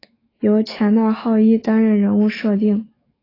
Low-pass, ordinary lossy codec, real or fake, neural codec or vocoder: 5.4 kHz; AAC, 24 kbps; fake; autoencoder, 48 kHz, 128 numbers a frame, DAC-VAE, trained on Japanese speech